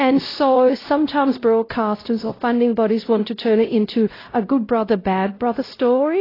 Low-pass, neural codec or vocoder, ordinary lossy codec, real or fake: 5.4 kHz; codec, 16 kHz, 0.5 kbps, X-Codec, WavLM features, trained on Multilingual LibriSpeech; AAC, 24 kbps; fake